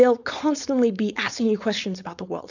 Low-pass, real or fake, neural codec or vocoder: 7.2 kHz; fake; codec, 16 kHz, 4.8 kbps, FACodec